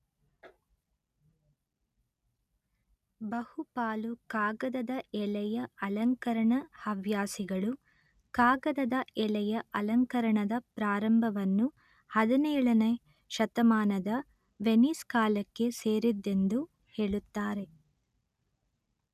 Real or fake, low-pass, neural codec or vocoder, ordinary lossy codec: real; 14.4 kHz; none; none